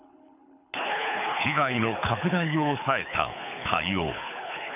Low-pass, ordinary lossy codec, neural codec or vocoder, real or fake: 3.6 kHz; none; codec, 24 kHz, 6 kbps, HILCodec; fake